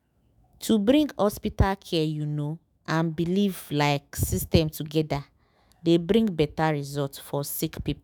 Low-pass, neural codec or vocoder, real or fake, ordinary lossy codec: none; autoencoder, 48 kHz, 128 numbers a frame, DAC-VAE, trained on Japanese speech; fake; none